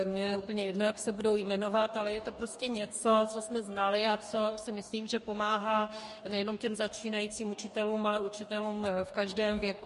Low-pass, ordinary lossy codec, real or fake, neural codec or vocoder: 14.4 kHz; MP3, 48 kbps; fake; codec, 44.1 kHz, 2.6 kbps, DAC